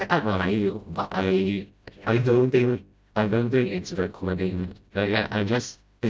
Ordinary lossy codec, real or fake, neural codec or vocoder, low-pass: none; fake; codec, 16 kHz, 0.5 kbps, FreqCodec, smaller model; none